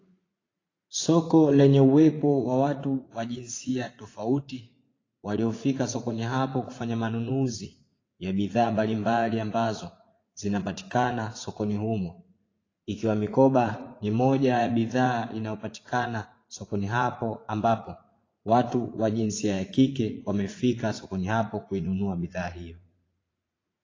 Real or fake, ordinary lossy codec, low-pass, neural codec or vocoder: fake; AAC, 32 kbps; 7.2 kHz; vocoder, 44.1 kHz, 80 mel bands, Vocos